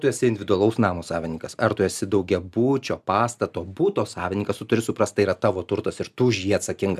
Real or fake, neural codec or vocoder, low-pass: real; none; 14.4 kHz